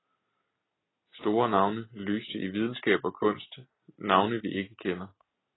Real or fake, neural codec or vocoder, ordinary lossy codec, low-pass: real; none; AAC, 16 kbps; 7.2 kHz